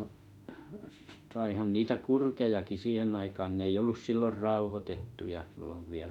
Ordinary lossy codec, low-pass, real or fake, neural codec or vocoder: none; 19.8 kHz; fake; autoencoder, 48 kHz, 32 numbers a frame, DAC-VAE, trained on Japanese speech